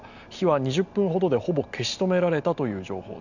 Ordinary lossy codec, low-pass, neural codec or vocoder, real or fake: none; 7.2 kHz; none; real